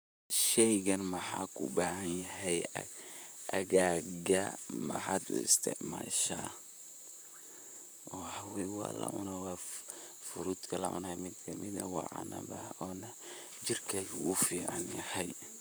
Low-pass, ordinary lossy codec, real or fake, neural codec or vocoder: none; none; fake; vocoder, 44.1 kHz, 128 mel bands, Pupu-Vocoder